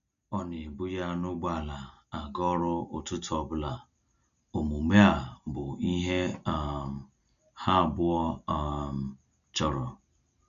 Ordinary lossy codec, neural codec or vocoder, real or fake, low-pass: none; none; real; 7.2 kHz